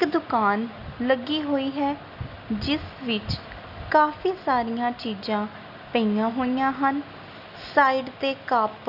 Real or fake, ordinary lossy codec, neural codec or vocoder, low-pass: real; none; none; 5.4 kHz